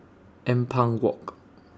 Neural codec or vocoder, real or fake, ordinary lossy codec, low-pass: none; real; none; none